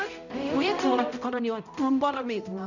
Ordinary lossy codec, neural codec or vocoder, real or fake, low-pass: none; codec, 16 kHz, 0.5 kbps, X-Codec, HuBERT features, trained on balanced general audio; fake; 7.2 kHz